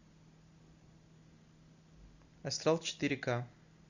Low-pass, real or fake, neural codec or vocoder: 7.2 kHz; real; none